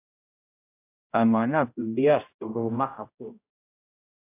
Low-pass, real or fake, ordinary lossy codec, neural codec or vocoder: 3.6 kHz; fake; AAC, 24 kbps; codec, 16 kHz, 0.5 kbps, X-Codec, HuBERT features, trained on general audio